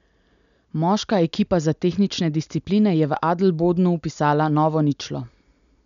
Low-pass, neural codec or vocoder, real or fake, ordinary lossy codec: 7.2 kHz; none; real; MP3, 96 kbps